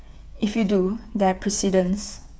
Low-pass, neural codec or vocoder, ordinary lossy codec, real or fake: none; codec, 16 kHz, 8 kbps, FreqCodec, smaller model; none; fake